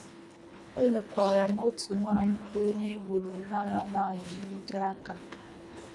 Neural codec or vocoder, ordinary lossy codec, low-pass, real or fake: codec, 24 kHz, 1.5 kbps, HILCodec; none; none; fake